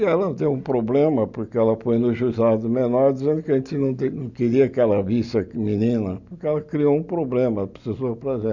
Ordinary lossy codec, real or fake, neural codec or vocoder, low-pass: none; real; none; 7.2 kHz